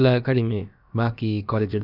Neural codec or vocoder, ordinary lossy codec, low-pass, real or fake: codec, 16 kHz, about 1 kbps, DyCAST, with the encoder's durations; none; 5.4 kHz; fake